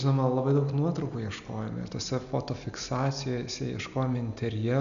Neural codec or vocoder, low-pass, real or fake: none; 7.2 kHz; real